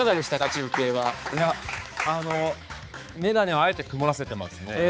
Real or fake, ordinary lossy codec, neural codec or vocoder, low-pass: fake; none; codec, 16 kHz, 4 kbps, X-Codec, HuBERT features, trained on balanced general audio; none